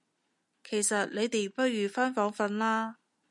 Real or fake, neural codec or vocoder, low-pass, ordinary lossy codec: real; none; 10.8 kHz; MP3, 96 kbps